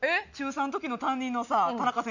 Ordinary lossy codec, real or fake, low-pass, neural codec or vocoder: none; real; 7.2 kHz; none